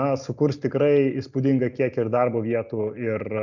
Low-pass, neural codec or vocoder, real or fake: 7.2 kHz; none; real